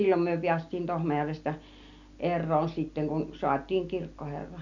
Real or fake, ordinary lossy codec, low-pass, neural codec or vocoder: real; none; 7.2 kHz; none